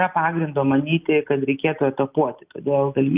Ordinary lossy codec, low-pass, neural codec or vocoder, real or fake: Opus, 24 kbps; 3.6 kHz; none; real